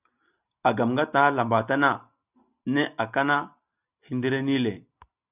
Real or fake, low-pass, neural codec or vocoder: real; 3.6 kHz; none